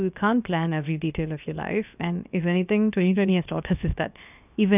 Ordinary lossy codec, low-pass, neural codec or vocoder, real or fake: none; 3.6 kHz; codec, 16 kHz, about 1 kbps, DyCAST, with the encoder's durations; fake